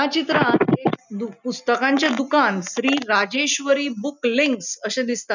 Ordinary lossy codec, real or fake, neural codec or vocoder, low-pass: none; real; none; 7.2 kHz